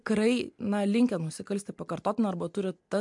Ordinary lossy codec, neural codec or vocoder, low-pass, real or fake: MP3, 64 kbps; none; 10.8 kHz; real